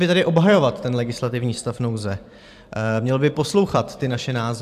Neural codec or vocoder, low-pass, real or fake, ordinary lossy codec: vocoder, 44.1 kHz, 128 mel bands every 512 samples, BigVGAN v2; 14.4 kHz; fake; AAC, 96 kbps